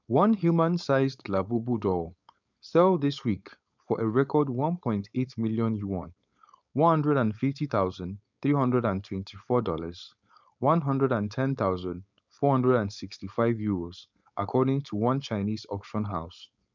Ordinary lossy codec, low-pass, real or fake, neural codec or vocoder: none; 7.2 kHz; fake; codec, 16 kHz, 4.8 kbps, FACodec